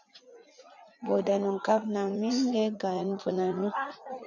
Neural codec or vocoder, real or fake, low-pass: vocoder, 44.1 kHz, 80 mel bands, Vocos; fake; 7.2 kHz